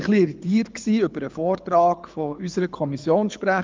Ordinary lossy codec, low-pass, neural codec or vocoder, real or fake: Opus, 32 kbps; 7.2 kHz; codec, 24 kHz, 6 kbps, HILCodec; fake